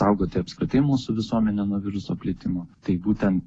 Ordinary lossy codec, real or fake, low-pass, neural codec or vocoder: AAC, 32 kbps; real; 9.9 kHz; none